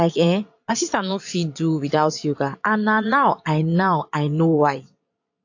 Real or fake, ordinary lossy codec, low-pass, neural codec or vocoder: fake; AAC, 48 kbps; 7.2 kHz; vocoder, 22.05 kHz, 80 mel bands, Vocos